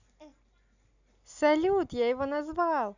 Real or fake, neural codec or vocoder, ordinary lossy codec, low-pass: real; none; none; 7.2 kHz